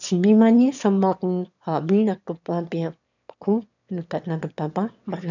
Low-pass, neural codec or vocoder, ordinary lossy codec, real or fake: 7.2 kHz; autoencoder, 22.05 kHz, a latent of 192 numbers a frame, VITS, trained on one speaker; none; fake